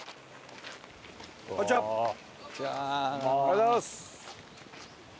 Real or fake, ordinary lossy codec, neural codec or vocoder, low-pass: real; none; none; none